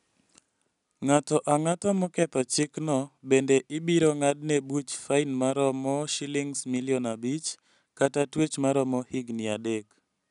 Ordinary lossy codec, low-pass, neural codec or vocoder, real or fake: none; 10.8 kHz; none; real